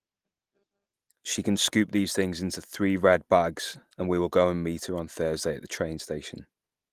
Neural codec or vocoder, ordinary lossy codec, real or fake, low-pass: none; Opus, 24 kbps; real; 14.4 kHz